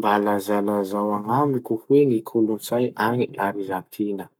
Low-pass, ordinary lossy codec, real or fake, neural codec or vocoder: none; none; fake; codec, 44.1 kHz, 7.8 kbps, Pupu-Codec